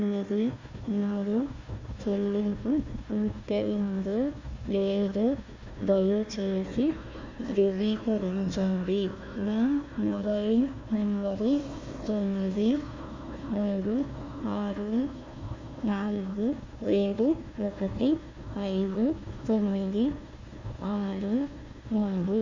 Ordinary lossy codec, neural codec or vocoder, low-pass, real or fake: MP3, 64 kbps; codec, 16 kHz, 1 kbps, FunCodec, trained on Chinese and English, 50 frames a second; 7.2 kHz; fake